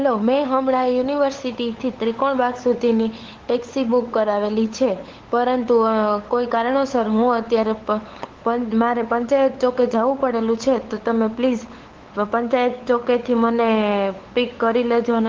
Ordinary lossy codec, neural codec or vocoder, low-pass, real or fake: Opus, 16 kbps; codec, 16 kHz, 8 kbps, FunCodec, trained on LibriTTS, 25 frames a second; 7.2 kHz; fake